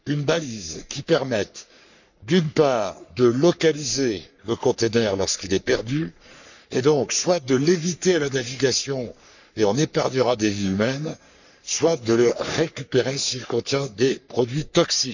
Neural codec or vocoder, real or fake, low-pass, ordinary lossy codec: codec, 44.1 kHz, 3.4 kbps, Pupu-Codec; fake; 7.2 kHz; none